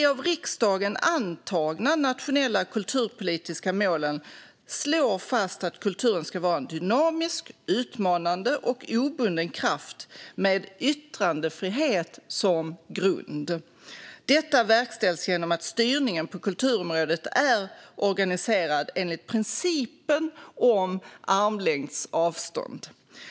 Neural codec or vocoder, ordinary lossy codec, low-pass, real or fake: none; none; none; real